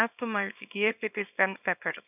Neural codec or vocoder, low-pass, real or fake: codec, 24 kHz, 0.9 kbps, WavTokenizer, small release; 3.6 kHz; fake